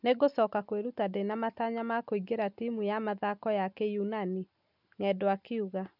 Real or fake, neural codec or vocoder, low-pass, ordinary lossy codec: real; none; 5.4 kHz; none